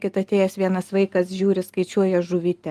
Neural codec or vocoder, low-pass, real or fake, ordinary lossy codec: none; 14.4 kHz; real; Opus, 32 kbps